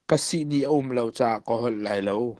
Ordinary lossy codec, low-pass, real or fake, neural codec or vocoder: Opus, 16 kbps; 10.8 kHz; fake; codec, 44.1 kHz, 7.8 kbps, DAC